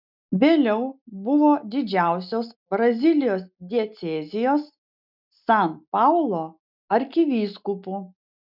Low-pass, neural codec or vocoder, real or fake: 5.4 kHz; none; real